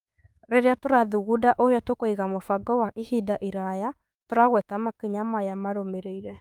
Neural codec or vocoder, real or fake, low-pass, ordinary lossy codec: autoencoder, 48 kHz, 32 numbers a frame, DAC-VAE, trained on Japanese speech; fake; 19.8 kHz; Opus, 32 kbps